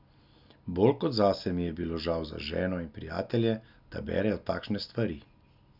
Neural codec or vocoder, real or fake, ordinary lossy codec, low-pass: none; real; none; 5.4 kHz